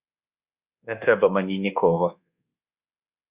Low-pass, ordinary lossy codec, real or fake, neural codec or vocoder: 3.6 kHz; Opus, 64 kbps; fake; codec, 24 kHz, 1.2 kbps, DualCodec